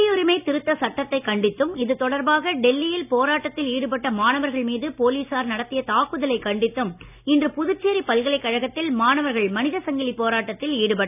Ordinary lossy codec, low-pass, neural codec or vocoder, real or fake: none; 3.6 kHz; none; real